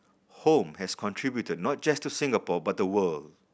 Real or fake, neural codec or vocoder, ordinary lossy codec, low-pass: real; none; none; none